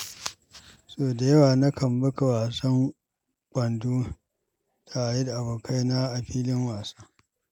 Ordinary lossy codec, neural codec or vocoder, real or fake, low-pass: none; none; real; 19.8 kHz